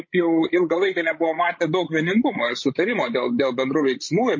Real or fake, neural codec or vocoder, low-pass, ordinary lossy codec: fake; codec, 16 kHz, 16 kbps, FreqCodec, larger model; 7.2 kHz; MP3, 32 kbps